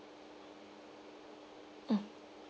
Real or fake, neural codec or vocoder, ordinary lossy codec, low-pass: real; none; none; none